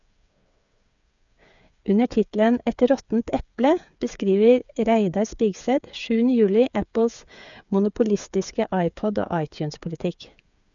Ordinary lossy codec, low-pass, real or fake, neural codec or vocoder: none; 7.2 kHz; fake; codec, 16 kHz, 8 kbps, FreqCodec, smaller model